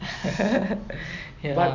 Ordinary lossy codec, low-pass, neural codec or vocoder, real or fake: none; 7.2 kHz; none; real